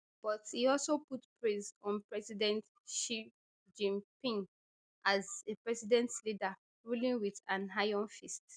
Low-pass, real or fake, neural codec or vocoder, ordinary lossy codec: 9.9 kHz; real; none; none